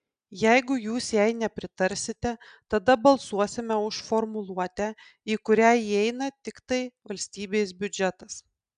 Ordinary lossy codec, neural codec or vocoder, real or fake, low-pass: AAC, 96 kbps; none; real; 9.9 kHz